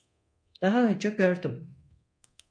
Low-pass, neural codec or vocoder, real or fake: 9.9 kHz; codec, 24 kHz, 0.9 kbps, DualCodec; fake